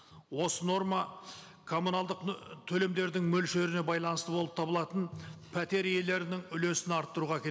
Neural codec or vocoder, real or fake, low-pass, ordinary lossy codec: none; real; none; none